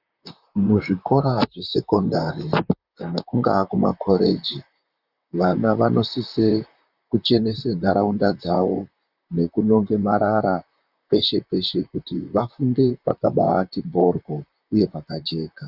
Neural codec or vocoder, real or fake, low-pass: vocoder, 44.1 kHz, 128 mel bands, Pupu-Vocoder; fake; 5.4 kHz